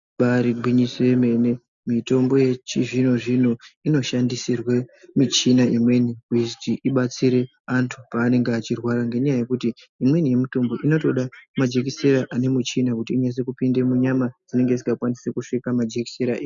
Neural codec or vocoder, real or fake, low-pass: none; real; 7.2 kHz